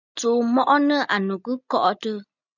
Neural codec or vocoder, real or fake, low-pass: none; real; 7.2 kHz